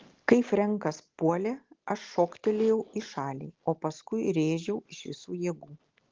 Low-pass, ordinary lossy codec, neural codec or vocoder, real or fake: 7.2 kHz; Opus, 16 kbps; none; real